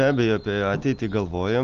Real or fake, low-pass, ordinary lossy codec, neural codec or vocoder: real; 7.2 kHz; Opus, 32 kbps; none